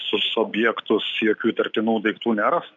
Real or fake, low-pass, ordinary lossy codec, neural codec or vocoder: real; 7.2 kHz; MP3, 96 kbps; none